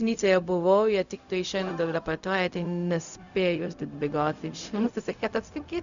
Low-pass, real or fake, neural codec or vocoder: 7.2 kHz; fake; codec, 16 kHz, 0.4 kbps, LongCat-Audio-Codec